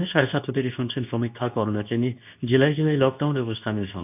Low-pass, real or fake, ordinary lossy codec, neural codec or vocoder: 3.6 kHz; fake; none; codec, 24 kHz, 0.9 kbps, WavTokenizer, medium speech release version 2